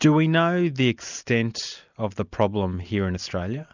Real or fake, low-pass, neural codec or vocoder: real; 7.2 kHz; none